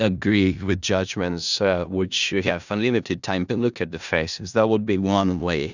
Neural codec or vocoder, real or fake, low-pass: codec, 16 kHz in and 24 kHz out, 0.4 kbps, LongCat-Audio-Codec, four codebook decoder; fake; 7.2 kHz